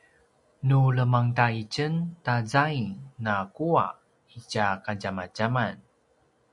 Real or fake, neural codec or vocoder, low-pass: real; none; 10.8 kHz